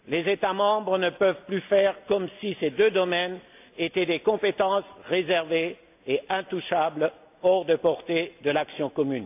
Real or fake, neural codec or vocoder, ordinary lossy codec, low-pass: real; none; AAC, 32 kbps; 3.6 kHz